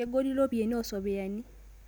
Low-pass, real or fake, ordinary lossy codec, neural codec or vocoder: none; real; none; none